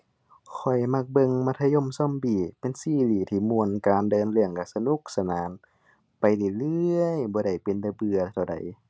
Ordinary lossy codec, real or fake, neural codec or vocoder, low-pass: none; real; none; none